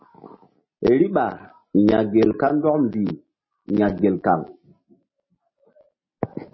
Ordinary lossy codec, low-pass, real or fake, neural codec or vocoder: MP3, 24 kbps; 7.2 kHz; real; none